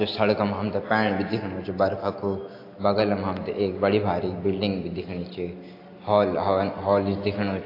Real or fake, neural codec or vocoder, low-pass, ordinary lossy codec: real; none; 5.4 kHz; AAC, 32 kbps